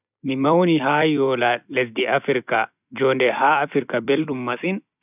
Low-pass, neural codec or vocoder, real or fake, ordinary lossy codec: 3.6 kHz; vocoder, 44.1 kHz, 128 mel bands every 256 samples, BigVGAN v2; fake; none